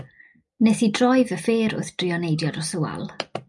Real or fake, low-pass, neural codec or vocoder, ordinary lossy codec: real; 10.8 kHz; none; MP3, 96 kbps